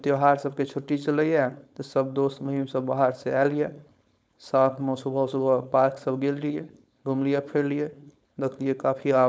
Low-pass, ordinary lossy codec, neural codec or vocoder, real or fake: none; none; codec, 16 kHz, 4.8 kbps, FACodec; fake